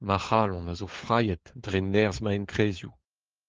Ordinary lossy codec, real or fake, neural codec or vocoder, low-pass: Opus, 16 kbps; fake; codec, 16 kHz, 4 kbps, FunCodec, trained on LibriTTS, 50 frames a second; 7.2 kHz